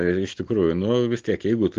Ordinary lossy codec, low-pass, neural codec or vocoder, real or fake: Opus, 16 kbps; 7.2 kHz; none; real